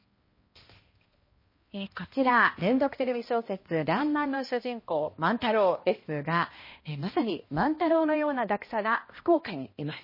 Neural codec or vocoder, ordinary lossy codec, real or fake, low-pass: codec, 16 kHz, 1 kbps, X-Codec, HuBERT features, trained on balanced general audio; MP3, 24 kbps; fake; 5.4 kHz